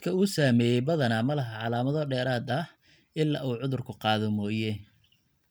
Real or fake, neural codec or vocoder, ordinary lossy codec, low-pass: real; none; none; none